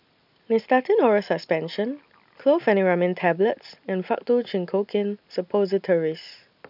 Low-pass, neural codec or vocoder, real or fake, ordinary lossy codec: 5.4 kHz; none; real; none